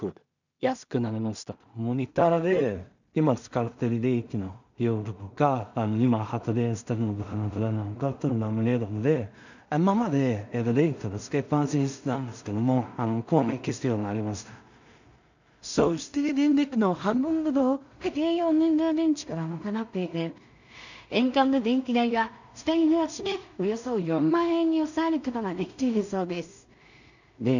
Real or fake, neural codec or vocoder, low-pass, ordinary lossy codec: fake; codec, 16 kHz in and 24 kHz out, 0.4 kbps, LongCat-Audio-Codec, two codebook decoder; 7.2 kHz; none